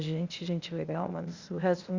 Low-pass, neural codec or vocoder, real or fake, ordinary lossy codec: 7.2 kHz; codec, 16 kHz, 0.8 kbps, ZipCodec; fake; Opus, 64 kbps